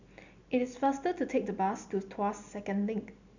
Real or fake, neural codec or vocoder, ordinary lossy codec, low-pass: real; none; none; 7.2 kHz